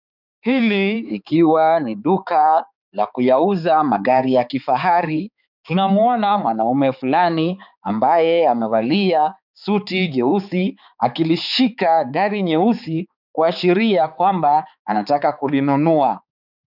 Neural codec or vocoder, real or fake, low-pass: codec, 16 kHz, 4 kbps, X-Codec, HuBERT features, trained on balanced general audio; fake; 5.4 kHz